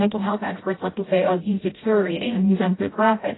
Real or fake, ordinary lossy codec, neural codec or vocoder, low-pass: fake; AAC, 16 kbps; codec, 16 kHz, 0.5 kbps, FreqCodec, smaller model; 7.2 kHz